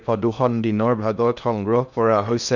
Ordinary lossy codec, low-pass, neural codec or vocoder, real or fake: none; 7.2 kHz; codec, 16 kHz in and 24 kHz out, 0.6 kbps, FocalCodec, streaming, 2048 codes; fake